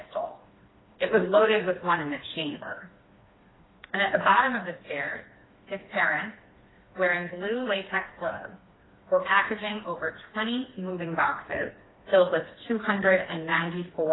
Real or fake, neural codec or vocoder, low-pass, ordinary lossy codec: fake; codec, 16 kHz, 2 kbps, FreqCodec, smaller model; 7.2 kHz; AAC, 16 kbps